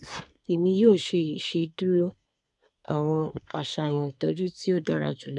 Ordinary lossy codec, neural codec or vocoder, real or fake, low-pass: none; codec, 24 kHz, 1 kbps, SNAC; fake; 10.8 kHz